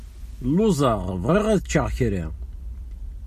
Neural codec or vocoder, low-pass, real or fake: none; 14.4 kHz; real